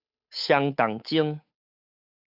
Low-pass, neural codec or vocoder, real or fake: 5.4 kHz; codec, 16 kHz, 8 kbps, FunCodec, trained on Chinese and English, 25 frames a second; fake